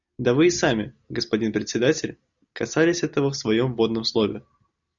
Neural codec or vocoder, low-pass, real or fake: none; 7.2 kHz; real